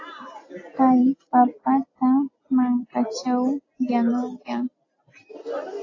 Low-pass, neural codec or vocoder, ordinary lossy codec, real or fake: 7.2 kHz; none; AAC, 32 kbps; real